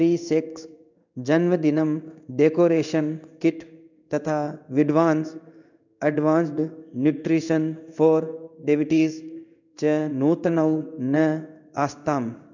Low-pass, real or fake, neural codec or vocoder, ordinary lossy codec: 7.2 kHz; fake; codec, 16 kHz in and 24 kHz out, 1 kbps, XY-Tokenizer; none